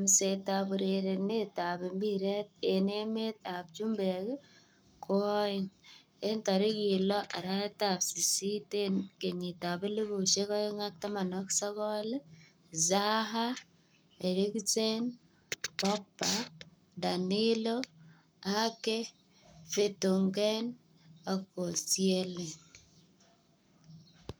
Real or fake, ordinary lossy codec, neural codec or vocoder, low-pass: fake; none; codec, 44.1 kHz, 7.8 kbps, Pupu-Codec; none